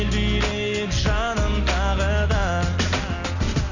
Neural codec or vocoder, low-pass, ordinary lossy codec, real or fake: none; 7.2 kHz; Opus, 64 kbps; real